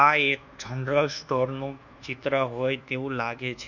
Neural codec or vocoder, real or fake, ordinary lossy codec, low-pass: codec, 24 kHz, 1.2 kbps, DualCodec; fake; none; 7.2 kHz